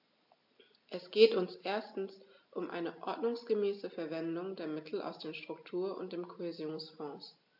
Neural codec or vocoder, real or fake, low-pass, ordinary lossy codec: none; real; 5.4 kHz; AAC, 48 kbps